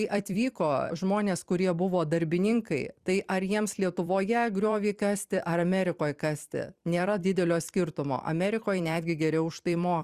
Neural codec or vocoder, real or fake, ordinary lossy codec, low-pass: vocoder, 44.1 kHz, 128 mel bands every 256 samples, BigVGAN v2; fake; Opus, 64 kbps; 14.4 kHz